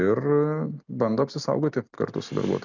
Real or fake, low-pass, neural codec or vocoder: real; 7.2 kHz; none